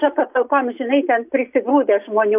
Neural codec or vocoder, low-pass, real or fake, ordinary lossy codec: none; 3.6 kHz; real; AAC, 32 kbps